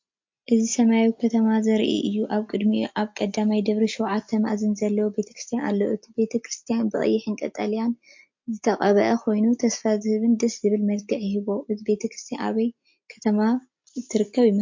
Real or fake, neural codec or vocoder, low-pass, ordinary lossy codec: real; none; 7.2 kHz; MP3, 48 kbps